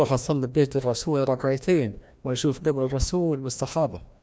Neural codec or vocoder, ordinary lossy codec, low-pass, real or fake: codec, 16 kHz, 1 kbps, FreqCodec, larger model; none; none; fake